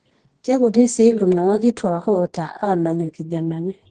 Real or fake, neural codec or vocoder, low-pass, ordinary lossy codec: fake; codec, 24 kHz, 0.9 kbps, WavTokenizer, medium music audio release; 10.8 kHz; Opus, 16 kbps